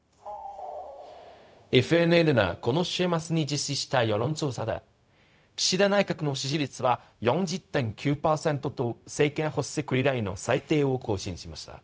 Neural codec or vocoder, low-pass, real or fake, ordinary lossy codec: codec, 16 kHz, 0.4 kbps, LongCat-Audio-Codec; none; fake; none